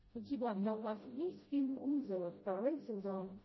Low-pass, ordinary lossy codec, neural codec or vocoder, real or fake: 7.2 kHz; MP3, 24 kbps; codec, 16 kHz, 0.5 kbps, FreqCodec, smaller model; fake